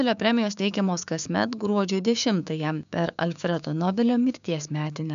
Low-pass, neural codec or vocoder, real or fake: 7.2 kHz; codec, 16 kHz, 6 kbps, DAC; fake